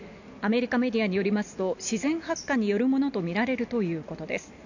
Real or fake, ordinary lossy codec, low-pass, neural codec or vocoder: real; none; 7.2 kHz; none